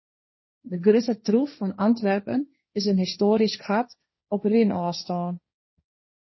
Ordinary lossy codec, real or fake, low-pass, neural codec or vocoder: MP3, 24 kbps; fake; 7.2 kHz; codec, 16 kHz, 1.1 kbps, Voila-Tokenizer